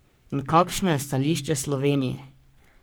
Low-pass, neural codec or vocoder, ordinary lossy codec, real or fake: none; codec, 44.1 kHz, 3.4 kbps, Pupu-Codec; none; fake